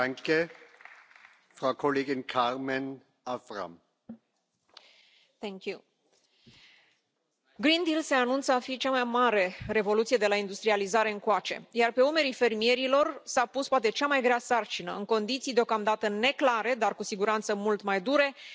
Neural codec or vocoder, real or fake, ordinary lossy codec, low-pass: none; real; none; none